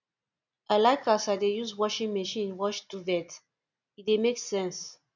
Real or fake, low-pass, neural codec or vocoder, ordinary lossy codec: real; 7.2 kHz; none; none